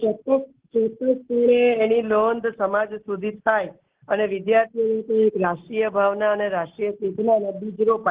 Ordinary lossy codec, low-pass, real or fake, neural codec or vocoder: Opus, 16 kbps; 3.6 kHz; real; none